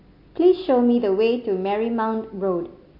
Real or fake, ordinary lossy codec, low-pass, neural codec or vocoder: real; MP3, 32 kbps; 5.4 kHz; none